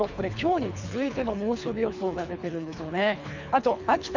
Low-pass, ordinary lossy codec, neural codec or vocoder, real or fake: 7.2 kHz; none; codec, 24 kHz, 3 kbps, HILCodec; fake